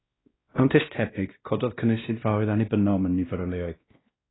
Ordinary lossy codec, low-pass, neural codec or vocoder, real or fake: AAC, 16 kbps; 7.2 kHz; codec, 16 kHz, 1 kbps, X-Codec, WavLM features, trained on Multilingual LibriSpeech; fake